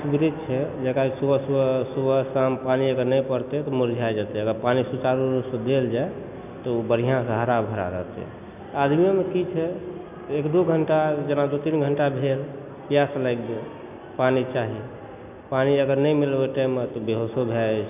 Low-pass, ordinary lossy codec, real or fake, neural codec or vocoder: 3.6 kHz; none; real; none